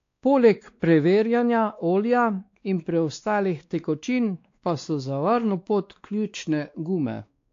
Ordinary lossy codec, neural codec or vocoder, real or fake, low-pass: AAC, 48 kbps; codec, 16 kHz, 2 kbps, X-Codec, WavLM features, trained on Multilingual LibriSpeech; fake; 7.2 kHz